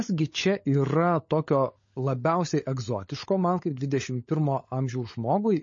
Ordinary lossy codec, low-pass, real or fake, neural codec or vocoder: MP3, 32 kbps; 7.2 kHz; fake; codec, 16 kHz, 16 kbps, FunCodec, trained on LibriTTS, 50 frames a second